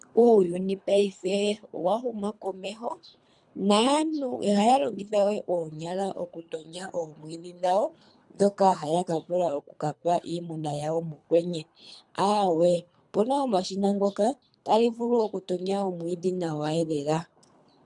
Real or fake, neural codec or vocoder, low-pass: fake; codec, 24 kHz, 3 kbps, HILCodec; 10.8 kHz